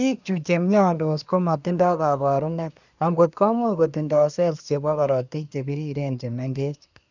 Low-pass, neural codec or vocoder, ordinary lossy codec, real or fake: 7.2 kHz; codec, 24 kHz, 1 kbps, SNAC; none; fake